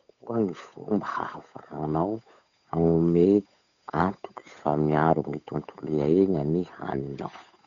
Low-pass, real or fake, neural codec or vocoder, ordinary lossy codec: 7.2 kHz; fake; codec, 16 kHz, 8 kbps, FunCodec, trained on Chinese and English, 25 frames a second; none